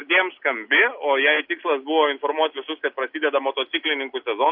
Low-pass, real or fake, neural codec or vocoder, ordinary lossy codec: 5.4 kHz; real; none; AAC, 48 kbps